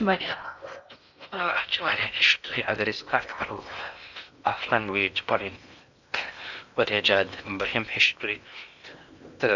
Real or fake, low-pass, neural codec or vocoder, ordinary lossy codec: fake; 7.2 kHz; codec, 16 kHz in and 24 kHz out, 0.8 kbps, FocalCodec, streaming, 65536 codes; none